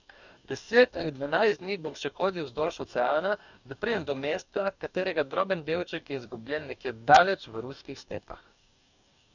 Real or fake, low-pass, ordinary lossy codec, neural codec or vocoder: fake; 7.2 kHz; none; codec, 44.1 kHz, 2.6 kbps, DAC